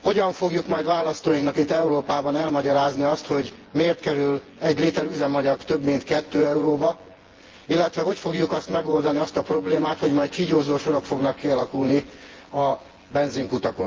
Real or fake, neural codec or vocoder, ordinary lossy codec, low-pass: fake; vocoder, 24 kHz, 100 mel bands, Vocos; Opus, 16 kbps; 7.2 kHz